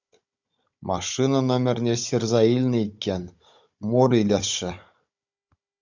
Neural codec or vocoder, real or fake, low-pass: codec, 16 kHz, 16 kbps, FunCodec, trained on Chinese and English, 50 frames a second; fake; 7.2 kHz